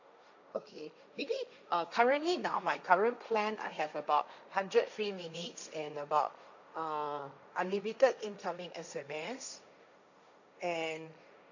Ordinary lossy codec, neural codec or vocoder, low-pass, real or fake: none; codec, 16 kHz, 1.1 kbps, Voila-Tokenizer; 7.2 kHz; fake